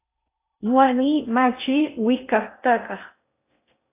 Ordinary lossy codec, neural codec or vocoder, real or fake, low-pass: AAC, 24 kbps; codec, 16 kHz in and 24 kHz out, 0.6 kbps, FocalCodec, streaming, 4096 codes; fake; 3.6 kHz